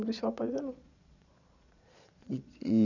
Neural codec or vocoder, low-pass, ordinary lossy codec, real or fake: vocoder, 22.05 kHz, 80 mel bands, Vocos; 7.2 kHz; none; fake